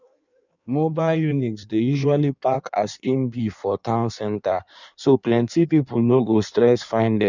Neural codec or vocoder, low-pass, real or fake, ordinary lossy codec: codec, 16 kHz in and 24 kHz out, 1.1 kbps, FireRedTTS-2 codec; 7.2 kHz; fake; none